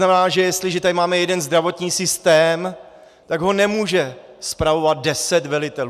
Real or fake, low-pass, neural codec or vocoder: real; 14.4 kHz; none